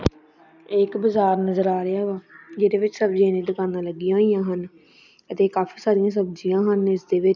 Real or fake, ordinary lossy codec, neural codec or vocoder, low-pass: real; none; none; 7.2 kHz